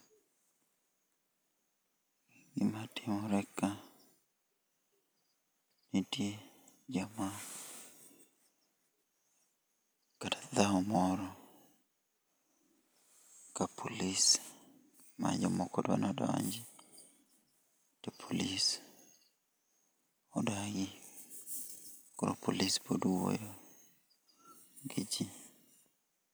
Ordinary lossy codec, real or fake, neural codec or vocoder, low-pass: none; real; none; none